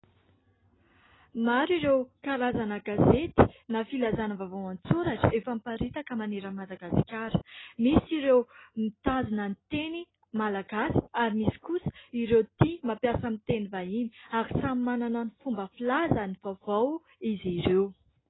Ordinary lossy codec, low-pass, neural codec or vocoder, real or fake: AAC, 16 kbps; 7.2 kHz; none; real